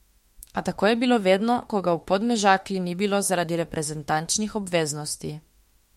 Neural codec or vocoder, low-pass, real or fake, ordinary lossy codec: autoencoder, 48 kHz, 32 numbers a frame, DAC-VAE, trained on Japanese speech; 19.8 kHz; fake; MP3, 64 kbps